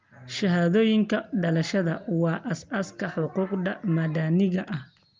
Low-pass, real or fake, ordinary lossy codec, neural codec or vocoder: 7.2 kHz; real; Opus, 24 kbps; none